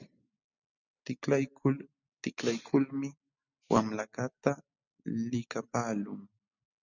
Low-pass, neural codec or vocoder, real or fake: 7.2 kHz; none; real